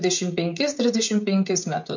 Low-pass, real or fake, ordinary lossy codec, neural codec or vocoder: 7.2 kHz; fake; MP3, 48 kbps; codec, 16 kHz, 16 kbps, FreqCodec, smaller model